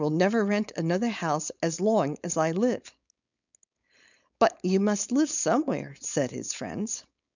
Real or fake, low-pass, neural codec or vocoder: fake; 7.2 kHz; codec, 16 kHz, 4.8 kbps, FACodec